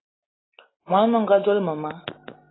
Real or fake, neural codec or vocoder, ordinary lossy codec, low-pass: real; none; AAC, 16 kbps; 7.2 kHz